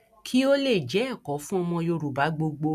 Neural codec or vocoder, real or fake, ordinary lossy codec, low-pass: none; real; none; 14.4 kHz